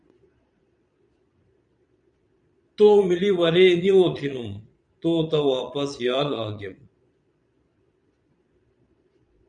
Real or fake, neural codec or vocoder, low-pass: fake; vocoder, 22.05 kHz, 80 mel bands, Vocos; 9.9 kHz